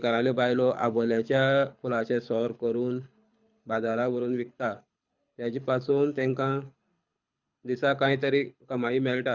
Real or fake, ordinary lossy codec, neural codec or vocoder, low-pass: fake; Opus, 64 kbps; codec, 24 kHz, 6 kbps, HILCodec; 7.2 kHz